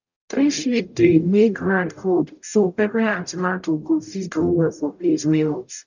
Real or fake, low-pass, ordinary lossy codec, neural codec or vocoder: fake; 7.2 kHz; none; codec, 44.1 kHz, 0.9 kbps, DAC